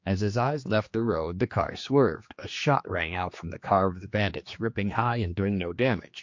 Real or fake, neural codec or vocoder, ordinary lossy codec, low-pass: fake; codec, 16 kHz, 2 kbps, X-Codec, HuBERT features, trained on general audio; MP3, 48 kbps; 7.2 kHz